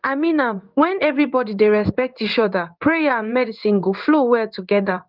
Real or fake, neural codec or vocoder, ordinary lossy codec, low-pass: fake; codec, 16 kHz in and 24 kHz out, 1 kbps, XY-Tokenizer; Opus, 24 kbps; 5.4 kHz